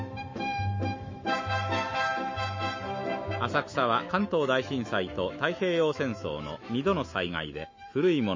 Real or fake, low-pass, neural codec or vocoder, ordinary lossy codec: fake; 7.2 kHz; autoencoder, 48 kHz, 128 numbers a frame, DAC-VAE, trained on Japanese speech; MP3, 32 kbps